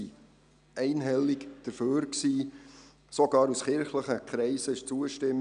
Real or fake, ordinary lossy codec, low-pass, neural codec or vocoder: real; none; 9.9 kHz; none